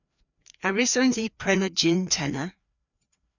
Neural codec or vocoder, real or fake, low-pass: codec, 16 kHz, 2 kbps, FreqCodec, larger model; fake; 7.2 kHz